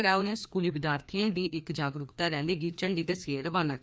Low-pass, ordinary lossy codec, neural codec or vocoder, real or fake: none; none; codec, 16 kHz, 2 kbps, FreqCodec, larger model; fake